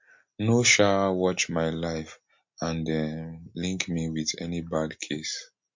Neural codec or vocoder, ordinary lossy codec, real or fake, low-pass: none; MP3, 48 kbps; real; 7.2 kHz